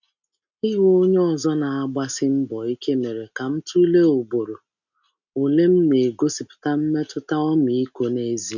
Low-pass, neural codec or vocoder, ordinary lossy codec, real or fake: 7.2 kHz; none; none; real